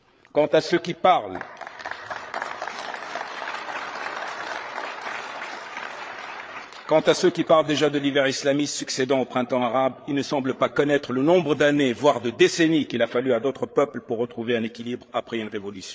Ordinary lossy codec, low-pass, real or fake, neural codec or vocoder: none; none; fake; codec, 16 kHz, 8 kbps, FreqCodec, larger model